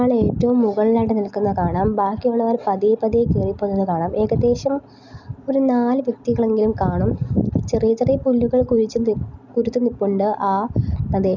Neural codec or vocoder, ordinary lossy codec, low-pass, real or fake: none; none; 7.2 kHz; real